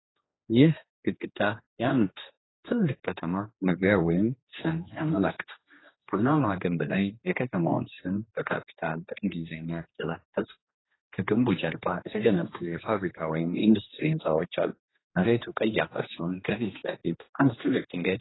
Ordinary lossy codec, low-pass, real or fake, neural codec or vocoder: AAC, 16 kbps; 7.2 kHz; fake; codec, 16 kHz, 2 kbps, X-Codec, HuBERT features, trained on general audio